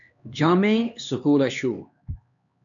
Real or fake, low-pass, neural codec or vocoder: fake; 7.2 kHz; codec, 16 kHz, 2 kbps, X-Codec, HuBERT features, trained on LibriSpeech